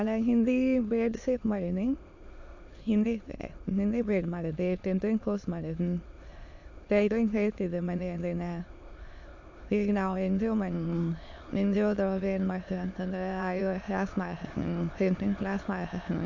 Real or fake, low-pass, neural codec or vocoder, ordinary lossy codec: fake; 7.2 kHz; autoencoder, 22.05 kHz, a latent of 192 numbers a frame, VITS, trained on many speakers; AAC, 48 kbps